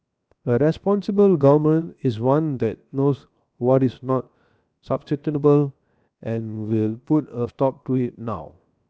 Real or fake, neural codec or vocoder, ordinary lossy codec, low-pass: fake; codec, 16 kHz, 0.7 kbps, FocalCodec; none; none